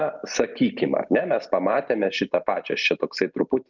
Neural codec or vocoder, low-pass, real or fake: none; 7.2 kHz; real